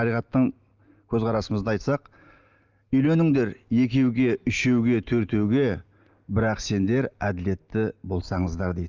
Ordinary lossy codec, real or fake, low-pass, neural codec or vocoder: Opus, 24 kbps; real; 7.2 kHz; none